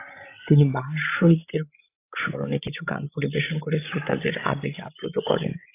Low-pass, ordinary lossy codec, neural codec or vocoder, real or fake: 3.6 kHz; AAC, 24 kbps; codec, 16 kHz, 16 kbps, FreqCodec, larger model; fake